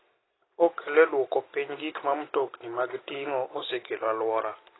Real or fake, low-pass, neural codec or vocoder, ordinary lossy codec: real; 7.2 kHz; none; AAC, 16 kbps